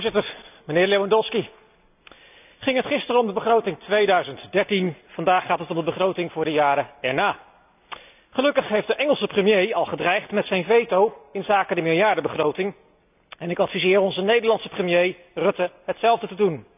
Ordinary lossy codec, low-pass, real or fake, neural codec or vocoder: none; 3.6 kHz; real; none